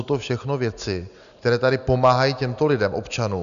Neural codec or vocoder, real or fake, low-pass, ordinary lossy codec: none; real; 7.2 kHz; MP3, 96 kbps